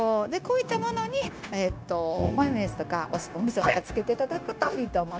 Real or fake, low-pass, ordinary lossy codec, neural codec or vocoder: fake; none; none; codec, 16 kHz, 0.9 kbps, LongCat-Audio-Codec